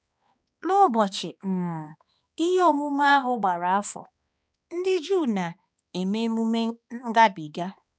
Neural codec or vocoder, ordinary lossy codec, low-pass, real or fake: codec, 16 kHz, 2 kbps, X-Codec, HuBERT features, trained on balanced general audio; none; none; fake